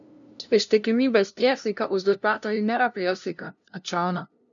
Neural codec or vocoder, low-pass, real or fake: codec, 16 kHz, 0.5 kbps, FunCodec, trained on LibriTTS, 25 frames a second; 7.2 kHz; fake